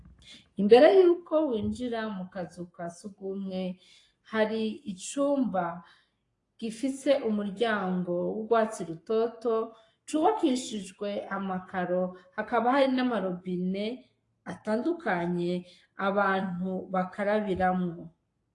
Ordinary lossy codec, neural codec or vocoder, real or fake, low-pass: AAC, 48 kbps; codec, 44.1 kHz, 7.8 kbps, Pupu-Codec; fake; 10.8 kHz